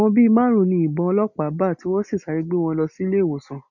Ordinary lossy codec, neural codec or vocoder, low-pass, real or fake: none; none; 7.2 kHz; real